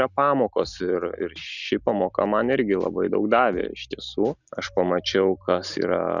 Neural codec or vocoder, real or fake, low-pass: none; real; 7.2 kHz